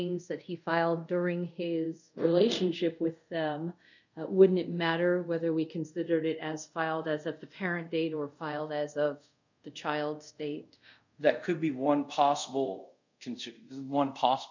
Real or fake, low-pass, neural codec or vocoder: fake; 7.2 kHz; codec, 24 kHz, 0.5 kbps, DualCodec